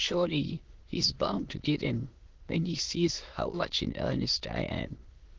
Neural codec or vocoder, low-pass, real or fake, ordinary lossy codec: autoencoder, 22.05 kHz, a latent of 192 numbers a frame, VITS, trained on many speakers; 7.2 kHz; fake; Opus, 16 kbps